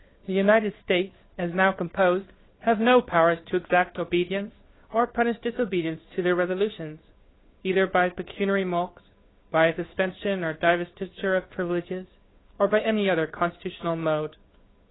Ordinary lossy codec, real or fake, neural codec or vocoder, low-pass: AAC, 16 kbps; fake; codec, 16 kHz, 2 kbps, FunCodec, trained on Chinese and English, 25 frames a second; 7.2 kHz